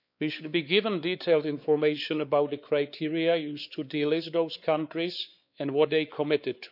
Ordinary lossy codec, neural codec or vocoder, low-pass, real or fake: none; codec, 16 kHz, 4 kbps, X-Codec, WavLM features, trained on Multilingual LibriSpeech; 5.4 kHz; fake